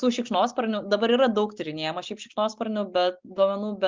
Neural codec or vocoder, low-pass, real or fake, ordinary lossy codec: none; 7.2 kHz; real; Opus, 24 kbps